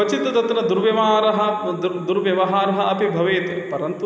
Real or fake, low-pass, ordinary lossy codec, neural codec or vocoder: real; none; none; none